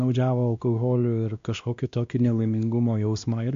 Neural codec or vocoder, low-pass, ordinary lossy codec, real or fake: codec, 16 kHz, 1 kbps, X-Codec, WavLM features, trained on Multilingual LibriSpeech; 7.2 kHz; MP3, 48 kbps; fake